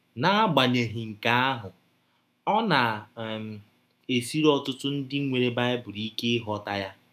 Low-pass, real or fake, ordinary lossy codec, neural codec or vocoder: 14.4 kHz; fake; none; autoencoder, 48 kHz, 128 numbers a frame, DAC-VAE, trained on Japanese speech